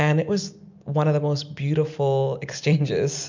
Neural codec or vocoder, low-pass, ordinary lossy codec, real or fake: none; 7.2 kHz; MP3, 64 kbps; real